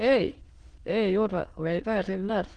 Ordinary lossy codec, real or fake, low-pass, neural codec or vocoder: Opus, 16 kbps; fake; 9.9 kHz; autoencoder, 22.05 kHz, a latent of 192 numbers a frame, VITS, trained on many speakers